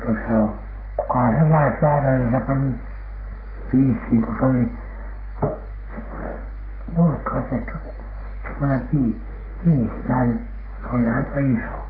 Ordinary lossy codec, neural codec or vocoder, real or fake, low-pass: AAC, 24 kbps; codec, 44.1 kHz, 3.4 kbps, Pupu-Codec; fake; 5.4 kHz